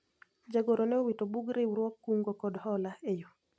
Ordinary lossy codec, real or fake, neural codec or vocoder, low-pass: none; real; none; none